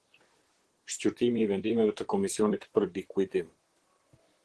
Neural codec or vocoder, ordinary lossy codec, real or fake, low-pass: vocoder, 44.1 kHz, 128 mel bands, Pupu-Vocoder; Opus, 16 kbps; fake; 10.8 kHz